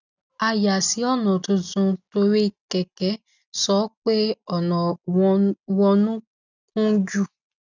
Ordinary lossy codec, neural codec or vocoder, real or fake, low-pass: none; none; real; 7.2 kHz